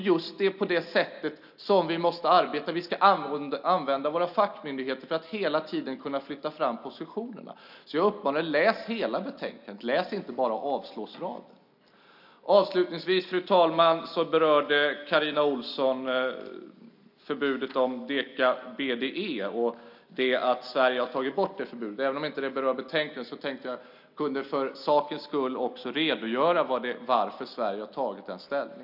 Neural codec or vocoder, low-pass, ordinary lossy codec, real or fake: none; 5.4 kHz; none; real